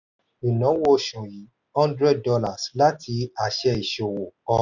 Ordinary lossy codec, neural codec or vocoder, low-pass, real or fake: none; none; 7.2 kHz; real